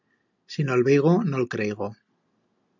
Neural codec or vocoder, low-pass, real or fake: none; 7.2 kHz; real